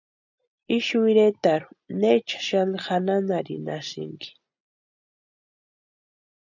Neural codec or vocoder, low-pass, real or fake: none; 7.2 kHz; real